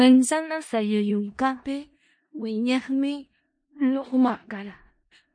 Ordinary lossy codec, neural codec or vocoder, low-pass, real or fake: MP3, 48 kbps; codec, 16 kHz in and 24 kHz out, 0.4 kbps, LongCat-Audio-Codec, four codebook decoder; 9.9 kHz; fake